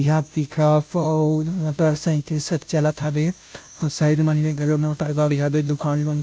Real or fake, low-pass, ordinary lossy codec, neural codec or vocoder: fake; none; none; codec, 16 kHz, 0.5 kbps, FunCodec, trained on Chinese and English, 25 frames a second